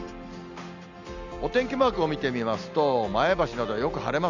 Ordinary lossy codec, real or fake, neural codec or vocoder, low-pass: none; real; none; 7.2 kHz